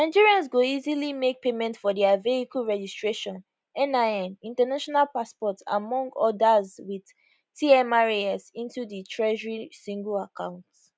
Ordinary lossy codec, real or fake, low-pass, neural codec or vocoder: none; real; none; none